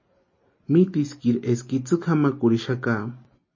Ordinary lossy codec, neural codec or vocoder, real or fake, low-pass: MP3, 32 kbps; none; real; 7.2 kHz